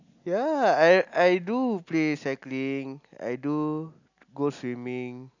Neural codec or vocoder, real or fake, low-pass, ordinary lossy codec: none; real; 7.2 kHz; none